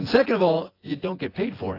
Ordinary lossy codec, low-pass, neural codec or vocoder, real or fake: AAC, 24 kbps; 5.4 kHz; vocoder, 24 kHz, 100 mel bands, Vocos; fake